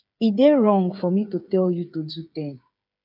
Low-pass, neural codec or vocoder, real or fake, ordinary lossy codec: 5.4 kHz; codec, 16 kHz, 8 kbps, FreqCodec, smaller model; fake; none